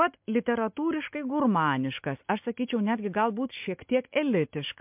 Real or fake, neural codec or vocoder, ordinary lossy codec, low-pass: real; none; MP3, 32 kbps; 3.6 kHz